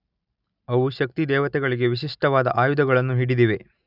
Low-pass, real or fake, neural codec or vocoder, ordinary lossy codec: 5.4 kHz; real; none; none